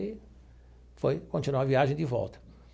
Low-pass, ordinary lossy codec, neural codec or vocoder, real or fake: none; none; none; real